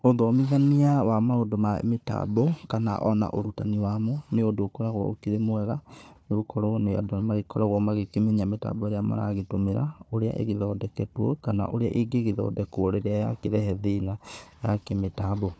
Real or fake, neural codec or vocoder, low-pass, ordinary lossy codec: fake; codec, 16 kHz, 4 kbps, FunCodec, trained on Chinese and English, 50 frames a second; none; none